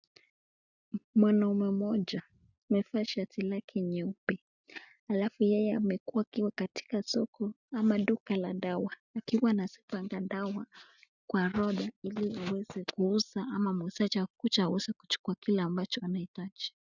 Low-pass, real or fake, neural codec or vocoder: 7.2 kHz; real; none